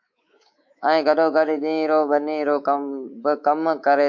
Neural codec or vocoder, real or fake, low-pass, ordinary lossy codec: codec, 24 kHz, 3.1 kbps, DualCodec; fake; 7.2 kHz; MP3, 48 kbps